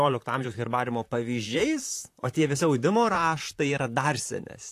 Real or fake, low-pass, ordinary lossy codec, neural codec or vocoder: fake; 14.4 kHz; AAC, 64 kbps; vocoder, 44.1 kHz, 128 mel bands, Pupu-Vocoder